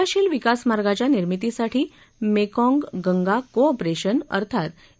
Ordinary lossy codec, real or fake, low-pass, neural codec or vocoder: none; real; none; none